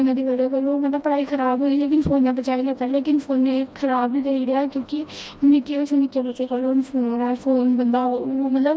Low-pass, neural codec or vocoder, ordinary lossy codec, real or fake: none; codec, 16 kHz, 1 kbps, FreqCodec, smaller model; none; fake